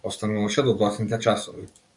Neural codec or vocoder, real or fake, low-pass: codec, 44.1 kHz, 7.8 kbps, DAC; fake; 10.8 kHz